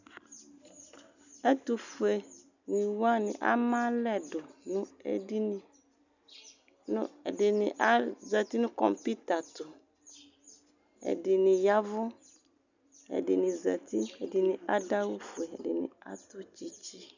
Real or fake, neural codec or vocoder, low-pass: real; none; 7.2 kHz